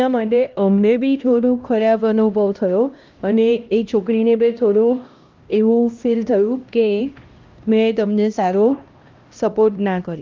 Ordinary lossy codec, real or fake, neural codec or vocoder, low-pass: Opus, 24 kbps; fake; codec, 16 kHz, 1 kbps, X-Codec, HuBERT features, trained on LibriSpeech; 7.2 kHz